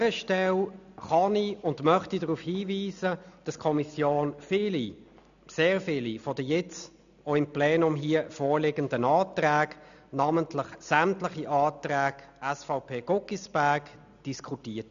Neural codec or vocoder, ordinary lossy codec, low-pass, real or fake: none; none; 7.2 kHz; real